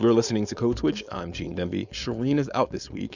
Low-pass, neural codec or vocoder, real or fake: 7.2 kHz; codec, 16 kHz, 4.8 kbps, FACodec; fake